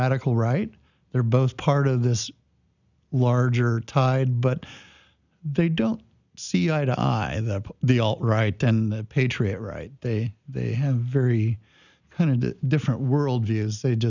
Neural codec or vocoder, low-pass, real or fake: none; 7.2 kHz; real